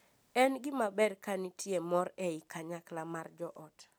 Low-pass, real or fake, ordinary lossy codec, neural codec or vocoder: none; real; none; none